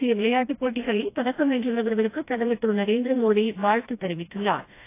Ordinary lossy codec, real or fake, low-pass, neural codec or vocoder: AAC, 24 kbps; fake; 3.6 kHz; codec, 16 kHz, 1 kbps, FreqCodec, smaller model